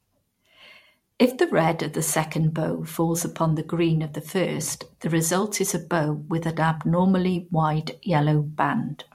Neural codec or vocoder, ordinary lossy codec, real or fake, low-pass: vocoder, 44.1 kHz, 128 mel bands every 512 samples, BigVGAN v2; MP3, 64 kbps; fake; 19.8 kHz